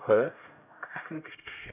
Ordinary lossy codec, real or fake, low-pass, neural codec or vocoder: none; fake; 3.6 kHz; codec, 16 kHz, 0.5 kbps, X-Codec, HuBERT features, trained on LibriSpeech